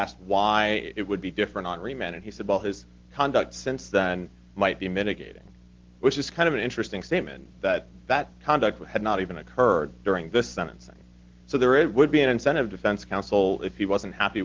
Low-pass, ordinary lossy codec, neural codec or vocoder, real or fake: 7.2 kHz; Opus, 16 kbps; none; real